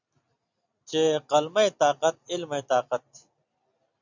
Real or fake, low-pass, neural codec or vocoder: real; 7.2 kHz; none